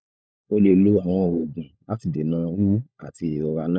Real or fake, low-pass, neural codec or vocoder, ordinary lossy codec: fake; none; codec, 16 kHz, 16 kbps, FunCodec, trained on LibriTTS, 50 frames a second; none